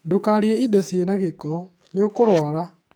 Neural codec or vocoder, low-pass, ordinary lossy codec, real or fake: codec, 44.1 kHz, 2.6 kbps, SNAC; none; none; fake